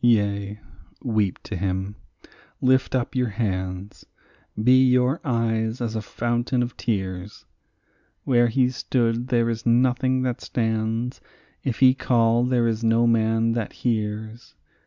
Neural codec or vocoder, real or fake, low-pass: none; real; 7.2 kHz